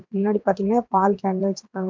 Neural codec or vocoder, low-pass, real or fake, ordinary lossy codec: vocoder, 22.05 kHz, 80 mel bands, WaveNeXt; 7.2 kHz; fake; MP3, 64 kbps